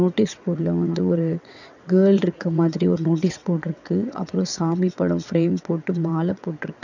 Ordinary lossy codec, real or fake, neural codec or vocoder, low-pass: none; fake; vocoder, 22.05 kHz, 80 mel bands, WaveNeXt; 7.2 kHz